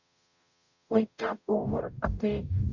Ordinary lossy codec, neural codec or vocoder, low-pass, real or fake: none; codec, 44.1 kHz, 0.9 kbps, DAC; 7.2 kHz; fake